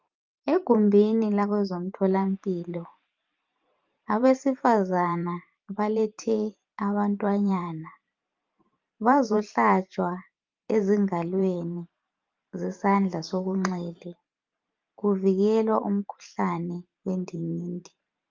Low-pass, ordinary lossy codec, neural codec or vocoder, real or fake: 7.2 kHz; Opus, 24 kbps; vocoder, 44.1 kHz, 128 mel bands every 512 samples, BigVGAN v2; fake